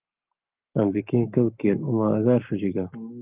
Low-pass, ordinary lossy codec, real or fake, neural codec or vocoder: 3.6 kHz; Opus, 24 kbps; fake; codec, 44.1 kHz, 7.8 kbps, Pupu-Codec